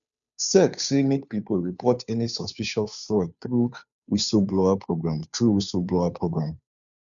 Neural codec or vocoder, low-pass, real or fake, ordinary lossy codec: codec, 16 kHz, 2 kbps, FunCodec, trained on Chinese and English, 25 frames a second; 7.2 kHz; fake; none